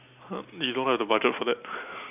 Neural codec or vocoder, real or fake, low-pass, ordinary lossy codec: none; real; 3.6 kHz; none